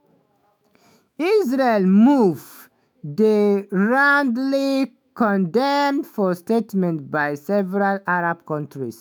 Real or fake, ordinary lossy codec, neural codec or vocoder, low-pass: fake; none; autoencoder, 48 kHz, 128 numbers a frame, DAC-VAE, trained on Japanese speech; none